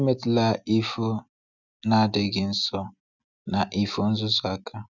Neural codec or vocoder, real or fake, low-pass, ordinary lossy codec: none; real; 7.2 kHz; none